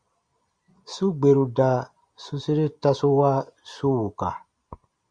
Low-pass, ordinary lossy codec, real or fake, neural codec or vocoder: 9.9 kHz; Opus, 64 kbps; real; none